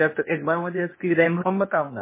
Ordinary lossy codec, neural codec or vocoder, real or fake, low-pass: MP3, 16 kbps; codec, 16 kHz, 0.8 kbps, ZipCodec; fake; 3.6 kHz